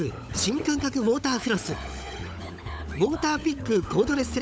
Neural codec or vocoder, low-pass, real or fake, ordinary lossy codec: codec, 16 kHz, 16 kbps, FunCodec, trained on LibriTTS, 50 frames a second; none; fake; none